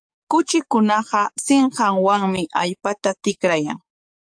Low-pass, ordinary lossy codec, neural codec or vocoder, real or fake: 9.9 kHz; AAC, 64 kbps; codec, 44.1 kHz, 7.8 kbps, DAC; fake